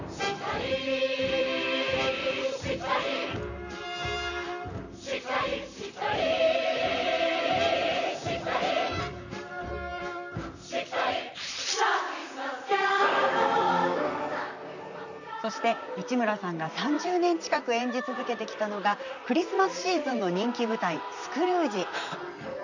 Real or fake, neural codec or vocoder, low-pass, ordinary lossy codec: fake; vocoder, 44.1 kHz, 128 mel bands, Pupu-Vocoder; 7.2 kHz; none